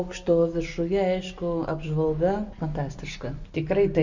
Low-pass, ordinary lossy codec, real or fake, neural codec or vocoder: 7.2 kHz; Opus, 64 kbps; real; none